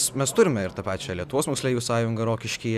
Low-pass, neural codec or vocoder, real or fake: 14.4 kHz; none; real